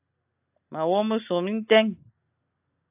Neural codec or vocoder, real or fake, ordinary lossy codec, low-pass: none; real; AAC, 32 kbps; 3.6 kHz